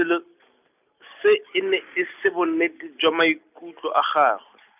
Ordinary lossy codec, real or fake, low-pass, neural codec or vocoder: none; real; 3.6 kHz; none